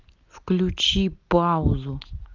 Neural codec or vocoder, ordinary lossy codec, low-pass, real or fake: none; Opus, 32 kbps; 7.2 kHz; real